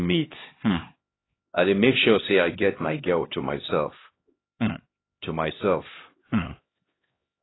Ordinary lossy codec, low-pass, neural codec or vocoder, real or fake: AAC, 16 kbps; 7.2 kHz; codec, 16 kHz, 1 kbps, X-Codec, HuBERT features, trained on LibriSpeech; fake